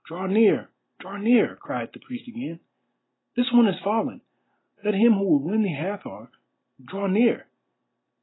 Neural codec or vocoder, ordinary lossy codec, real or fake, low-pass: none; AAC, 16 kbps; real; 7.2 kHz